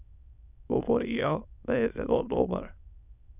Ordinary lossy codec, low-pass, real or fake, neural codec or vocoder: none; 3.6 kHz; fake; autoencoder, 22.05 kHz, a latent of 192 numbers a frame, VITS, trained on many speakers